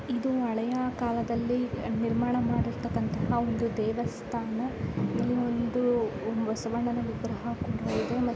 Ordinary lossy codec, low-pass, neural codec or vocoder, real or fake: none; none; none; real